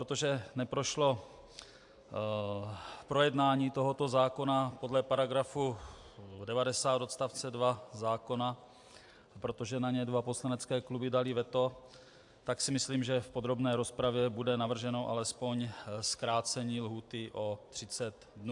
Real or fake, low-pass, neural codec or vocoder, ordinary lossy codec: real; 10.8 kHz; none; AAC, 64 kbps